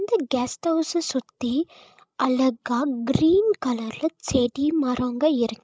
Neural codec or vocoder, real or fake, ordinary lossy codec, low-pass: codec, 16 kHz, 16 kbps, FreqCodec, larger model; fake; none; none